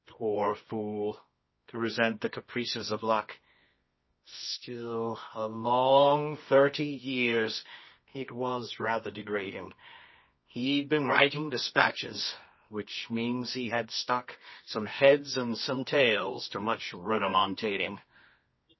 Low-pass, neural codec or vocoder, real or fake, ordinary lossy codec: 7.2 kHz; codec, 24 kHz, 0.9 kbps, WavTokenizer, medium music audio release; fake; MP3, 24 kbps